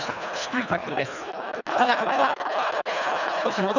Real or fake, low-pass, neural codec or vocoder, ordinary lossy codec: fake; 7.2 kHz; codec, 24 kHz, 1.5 kbps, HILCodec; none